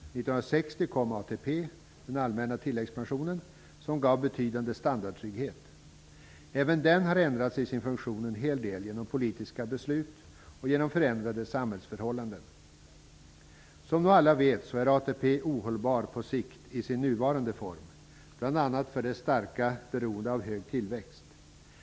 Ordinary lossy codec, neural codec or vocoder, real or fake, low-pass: none; none; real; none